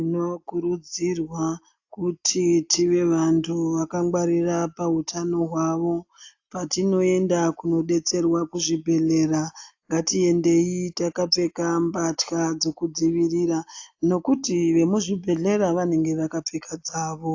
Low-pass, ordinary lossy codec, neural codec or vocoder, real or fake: 7.2 kHz; AAC, 48 kbps; none; real